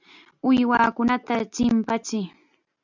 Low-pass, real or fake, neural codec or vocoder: 7.2 kHz; real; none